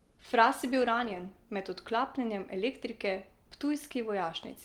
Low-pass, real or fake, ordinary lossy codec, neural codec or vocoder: 19.8 kHz; real; Opus, 24 kbps; none